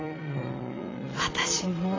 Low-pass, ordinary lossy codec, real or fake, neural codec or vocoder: 7.2 kHz; AAC, 32 kbps; fake; vocoder, 22.05 kHz, 80 mel bands, Vocos